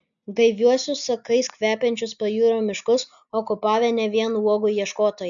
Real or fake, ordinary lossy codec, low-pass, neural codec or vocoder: real; MP3, 96 kbps; 7.2 kHz; none